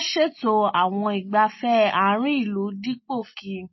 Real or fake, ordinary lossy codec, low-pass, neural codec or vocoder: real; MP3, 24 kbps; 7.2 kHz; none